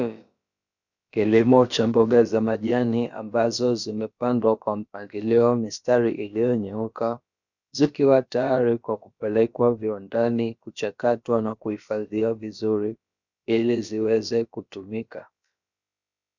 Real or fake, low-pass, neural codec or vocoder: fake; 7.2 kHz; codec, 16 kHz, about 1 kbps, DyCAST, with the encoder's durations